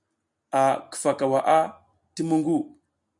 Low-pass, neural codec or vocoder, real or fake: 10.8 kHz; none; real